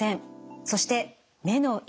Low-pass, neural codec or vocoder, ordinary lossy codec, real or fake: none; none; none; real